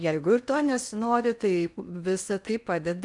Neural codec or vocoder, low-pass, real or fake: codec, 16 kHz in and 24 kHz out, 0.6 kbps, FocalCodec, streaming, 2048 codes; 10.8 kHz; fake